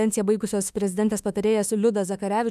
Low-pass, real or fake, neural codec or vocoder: 14.4 kHz; fake; autoencoder, 48 kHz, 32 numbers a frame, DAC-VAE, trained on Japanese speech